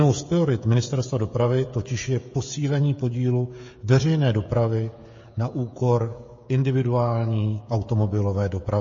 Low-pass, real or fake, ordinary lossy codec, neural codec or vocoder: 7.2 kHz; fake; MP3, 32 kbps; codec, 16 kHz, 16 kbps, FreqCodec, smaller model